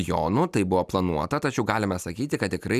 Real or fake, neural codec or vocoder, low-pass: real; none; 14.4 kHz